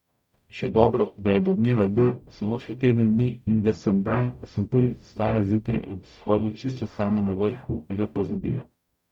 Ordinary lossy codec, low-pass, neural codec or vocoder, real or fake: none; 19.8 kHz; codec, 44.1 kHz, 0.9 kbps, DAC; fake